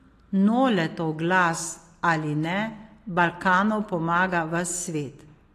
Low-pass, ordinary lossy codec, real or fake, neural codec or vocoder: 14.4 kHz; AAC, 48 kbps; real; none